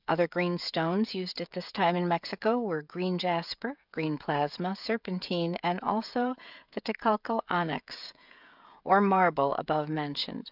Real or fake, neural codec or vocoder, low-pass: fake; codec, 16 kHz, 16 kbps, FreqCodec, smaller model; 5.4 kHz